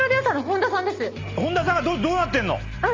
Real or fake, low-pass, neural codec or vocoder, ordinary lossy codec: real; 7.2 kHz; none; Opus, 32 kbps